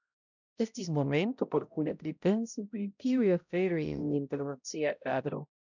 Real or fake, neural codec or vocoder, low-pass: fake; codec, 16 kHz, 0.5 kbps, X-Codec, HuBERT features, trained on balanced general audio; 7.2 kHz